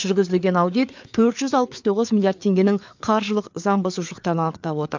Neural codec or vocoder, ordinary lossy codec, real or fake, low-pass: codec, 16 kHz, 4 kbps, FreqCodec, larger model; MP3, 64 kbps; fake; 7.2 kHz